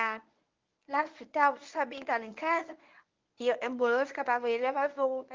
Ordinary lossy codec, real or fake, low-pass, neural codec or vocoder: Opus, 32 kbps; fake; 7.2 kHz; codec, 24 kHz, 0.9 kbps, WavTokenizer, medium speech release version 1